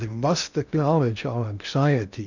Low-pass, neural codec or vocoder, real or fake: 7.2 kHz; codec, 16 kHz in and 24 kHz out, 0.6 kbps, FocalCodec, streaming, 2048 codes; fake